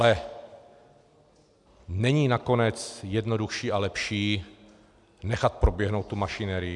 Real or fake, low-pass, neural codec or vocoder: real; 10.8 kHz; none